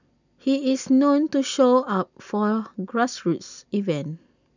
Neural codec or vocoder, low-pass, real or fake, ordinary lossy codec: none; 7.2 kHz; real; none